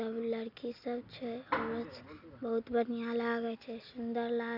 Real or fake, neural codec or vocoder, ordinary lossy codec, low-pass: real; none; none; 5.4 kHz